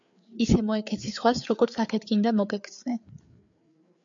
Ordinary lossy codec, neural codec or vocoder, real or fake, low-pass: MP3, 64 kbps; codec, 16 kHz, 4 kbps, FreqCodec, larger model; fake; 7.2 kHz